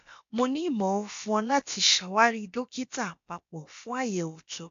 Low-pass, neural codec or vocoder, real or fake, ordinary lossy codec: 7.2 kHz; codec, 16 kHz, about 1 kbps, DyCAST, with the encoder's durations; fake; AAC, 64 kbps